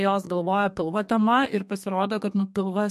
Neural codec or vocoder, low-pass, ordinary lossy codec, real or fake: codec, 32 kHz, 1.9 kbps, SNAC; 14.4 kHz; MP3, 64 kbps; fake